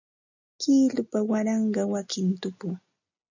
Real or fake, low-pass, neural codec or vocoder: real; 7.2 kHz; none